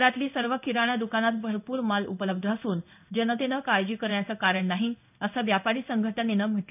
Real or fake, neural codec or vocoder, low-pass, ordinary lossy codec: fake; codec, 16 kHz in and 24 kHz out, 1 kbps, XY-Tokenizer; 3.6 kHz; none